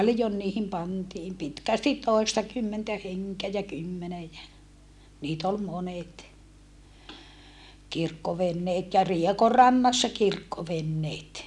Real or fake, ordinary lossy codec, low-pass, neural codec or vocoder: real; none; none; none